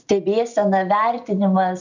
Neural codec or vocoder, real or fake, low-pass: none; real; 7.2 kHz